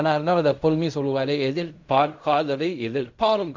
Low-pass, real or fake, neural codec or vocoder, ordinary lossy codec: 7.2 kHz; fake; codec, 16 kHz in and 24 kHz out, 0.4 kbps, LongCat-Audio-Codec, fine tuned four codebook decoder; MP3, 64 kbps